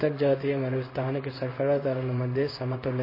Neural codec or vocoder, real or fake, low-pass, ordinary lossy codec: codec, 16 kHz in and 24 kHz out, 1 kbps, XY-Tokenizer; fake; 5.4 kHz; MP3, 24 kbps